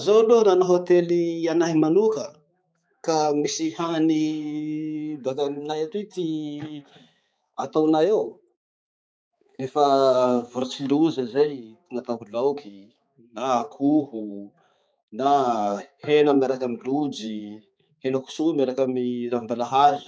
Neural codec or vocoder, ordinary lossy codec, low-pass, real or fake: codec, 16 kHz, 4 kbps, X-Codec, HuBERT features, trained on balanced general audio; none; none; fake